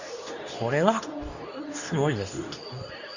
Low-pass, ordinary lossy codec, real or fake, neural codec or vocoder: 7.2 kHz; none; fake; codec, 24 kHz, 0.9 kbps, WavTokenizer, medium speech release version 2